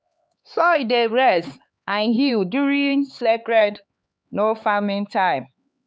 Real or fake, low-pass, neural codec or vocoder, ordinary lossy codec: fake; none; codec, 16 kHz, 4 kbps, X-Codec, HuBERT features, trained on LibriSpeech; none